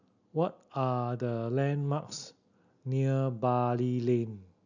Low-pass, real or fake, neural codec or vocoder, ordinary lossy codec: 7.2 kHz; real; none; none